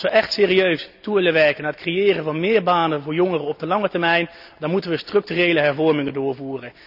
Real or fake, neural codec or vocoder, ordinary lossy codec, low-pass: real; none; none; 5.4 kHz